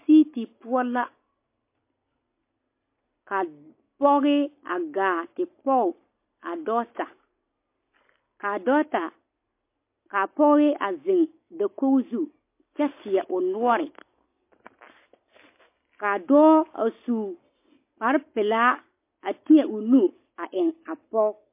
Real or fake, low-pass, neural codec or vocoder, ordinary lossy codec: real; 3.6 kHz; none; MP3, 24 kbps